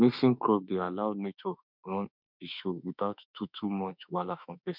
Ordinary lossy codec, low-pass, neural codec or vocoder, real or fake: none; 5.4 kHz; autoencoder, 48 kHz, 32 numbers a frame, DAC-VAE, trained on Japanese speech; fake